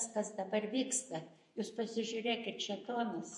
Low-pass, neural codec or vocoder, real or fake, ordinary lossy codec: 10.8 kHz; vocoder, 48 kHz, 128 mel bands, Vocos; fake; MP3, 48 kbps